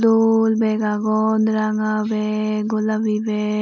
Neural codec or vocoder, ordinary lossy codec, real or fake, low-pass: none; none; real; 7.2 kHz